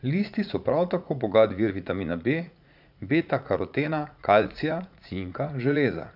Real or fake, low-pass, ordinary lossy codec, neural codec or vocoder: fake; 5.4 kHz; none; vocoder, 44.1 kHz, 80 mel bands, Vocos